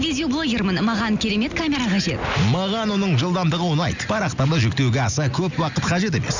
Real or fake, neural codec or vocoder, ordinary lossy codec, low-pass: real; none; none; 7.2 kHz